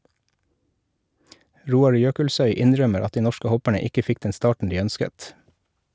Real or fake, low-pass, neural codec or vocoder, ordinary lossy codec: real; none; none; none